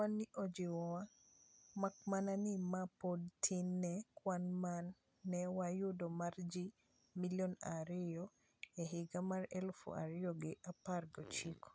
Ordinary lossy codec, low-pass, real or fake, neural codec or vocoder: none; none; real; none